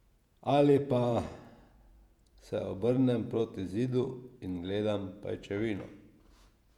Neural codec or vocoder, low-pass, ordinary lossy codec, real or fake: vocoder, 48 kHz, 128 mel bands, Vocos; 19.8 kHz; MP3, 96 kbps; fake